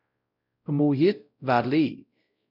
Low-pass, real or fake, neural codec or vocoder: 5.4 kHz; fake; codec, 16 kHz, 0.5 kbps, X-Codec, WavLM features, trained on Multilingual LibriSpeech